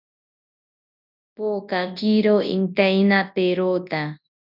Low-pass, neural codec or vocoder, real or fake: 5.4 kHz; codec, 24 kHz, 0.9 kbps, WavTokenizer, large speech release; fake